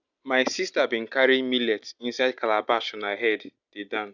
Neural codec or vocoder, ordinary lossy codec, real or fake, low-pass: none; none; real; 7.2 kHz